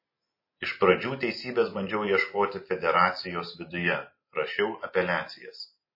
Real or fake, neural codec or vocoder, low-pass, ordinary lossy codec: real; none; 5.4 kHz; MP3, 24 kbps